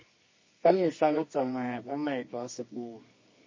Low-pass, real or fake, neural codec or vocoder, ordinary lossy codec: 7.2 kHz; fake; codec, 24 kHz, 0.9 kbps, WavTokenizer, medium music audio release; MP3, 32 kbps